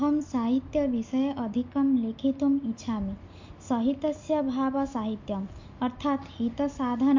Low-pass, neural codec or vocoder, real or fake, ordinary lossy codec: 7.2 kHz; none; real; MP3, 48 kbps